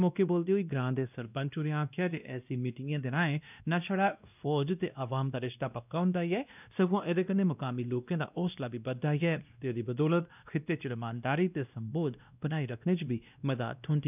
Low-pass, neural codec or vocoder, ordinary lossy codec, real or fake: 3.6 kHz; codec, 16 kHz, 1 kbps, X-Codec, WavLM features, trained on Multilingual LibriSpeech; none; fake